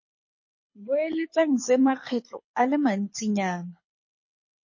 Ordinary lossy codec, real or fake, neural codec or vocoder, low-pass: MP3, 32 kbps; fake; codec, 24 kHz, 6 kbps, HILCodec; 7.2 kHz